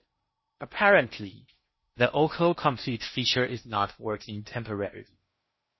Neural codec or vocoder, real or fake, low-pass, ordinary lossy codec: codec, 16 kHz in and 24 kHz out, 0.6 kbps, FocalCodec, streaming, 4096 codes; fake; 7.2 kHz; MP3, 24 kbps